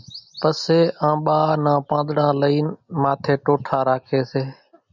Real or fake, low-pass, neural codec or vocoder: real; 7.2 kHz; none